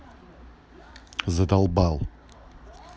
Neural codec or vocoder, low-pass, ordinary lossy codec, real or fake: none; none; none; real